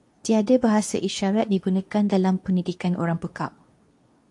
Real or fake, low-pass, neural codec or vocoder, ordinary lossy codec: fake; 10.8 kHz; codec, 24 kHz, 0.9 kbps, WavTokenizer, medium speech release version 2; AAC, 64 kbps